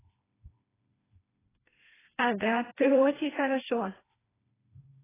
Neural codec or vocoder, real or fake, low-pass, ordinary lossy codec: codec, 16 kHz, 2 kbps, FreqCodec, smaller model; fake; 3.6 kHz; AAC, 16 kbps